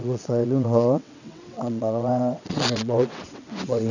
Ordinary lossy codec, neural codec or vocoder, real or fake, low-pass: none; vocoder, 22.05 kHz, 80 mel bands, WaveNeXt; fake; 7.2 kHz